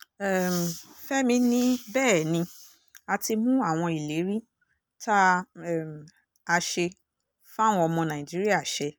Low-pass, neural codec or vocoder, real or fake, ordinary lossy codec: none; none; real; none